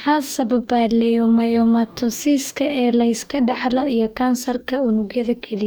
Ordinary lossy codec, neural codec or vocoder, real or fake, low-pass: none; codec, 44.1 kHz, 2.6 kbps, DAC; fake; none